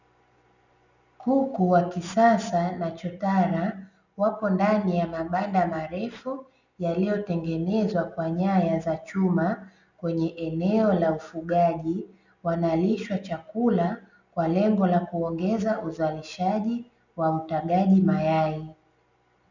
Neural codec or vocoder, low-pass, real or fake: none; 7.2 kHz; real